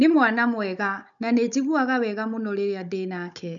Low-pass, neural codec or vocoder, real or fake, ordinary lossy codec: 7.2 kHz; codec, 16 kHz, 16 kbps, FunCodec, trained on Chinese and English, 50 frames a second; fake; none